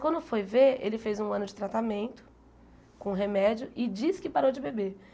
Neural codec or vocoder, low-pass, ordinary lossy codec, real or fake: none; none; none; real